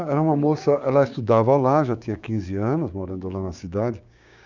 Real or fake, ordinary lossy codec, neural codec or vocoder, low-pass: fake; none; codec, 16 kHz, 6 kbps, DAC; 7.2 kHz